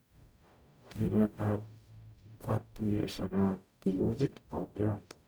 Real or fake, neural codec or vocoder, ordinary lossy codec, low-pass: fake; codec, 44.1 kHz, 0.9 kbps, DAC; none; none